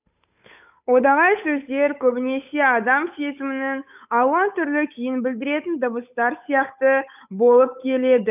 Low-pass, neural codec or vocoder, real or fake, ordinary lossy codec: 3.6 kHz; codec, 16 kHz, 8 kbps, FunCodec, trained on Chinese and English, 25 frames a second; fake; none